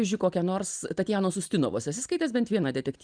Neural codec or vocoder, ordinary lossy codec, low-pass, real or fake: none; Opus, 32 kbps; 9.9 kHz; real